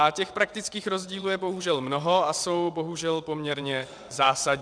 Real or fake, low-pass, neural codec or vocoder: fake; 9.9 kHz; vocoder, 22.05 kHz, 80 mel bands, WaveNeXt